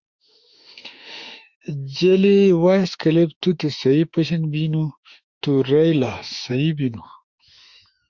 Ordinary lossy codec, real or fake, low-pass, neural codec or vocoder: Opus, 64 kbps; fake; 7.2 kHz; autoencoder, 48 kHz, 32 numbers a frame, DAC-VAE, trained on Japanese speech